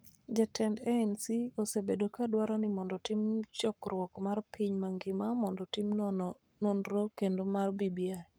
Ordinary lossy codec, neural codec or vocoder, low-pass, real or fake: none; codec, 44.1 kHz, 7.8 kbps, Pupu-Codec; none; fake